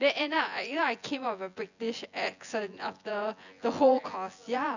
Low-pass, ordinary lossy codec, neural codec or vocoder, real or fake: 7.2 kHz; none; vocoder, 24 kHz, 100 mel bands, Vocos; fake